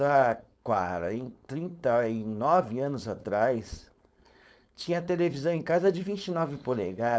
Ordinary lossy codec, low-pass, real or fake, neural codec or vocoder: none; none; fake; codec, 16 kHz, 4.8 kbps, FACodec